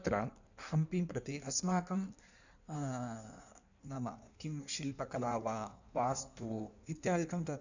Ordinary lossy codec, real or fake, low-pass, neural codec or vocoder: none; fake; 7.2 kHz; codec, 16 kHz in and 24 kHz out, 1.1 kbps, FireRedTTS-2 codec